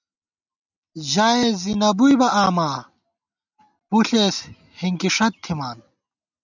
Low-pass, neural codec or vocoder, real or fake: 7.2 kHz; none; real